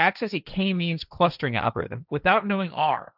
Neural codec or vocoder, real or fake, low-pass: codec, 16 kHz, 1.1 kbps, Voila-Tokenizer; fake; 5.4 kHz